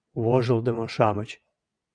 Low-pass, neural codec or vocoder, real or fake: 9.9 kHz; vocoder, 22.05 kHz, 80 mel bands, WaveNeXt; fake